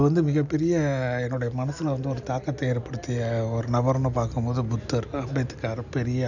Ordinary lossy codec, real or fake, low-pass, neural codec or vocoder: none; real; 7.2 kHz; none